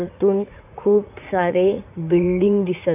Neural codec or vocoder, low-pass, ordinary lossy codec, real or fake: vocoder, 44.1 kHz, 80 mel bands, Vocos; 3.6 kHz; none; fake